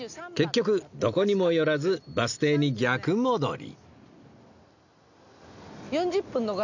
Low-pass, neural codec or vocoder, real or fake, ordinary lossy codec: 7.2 kHz; none; real; none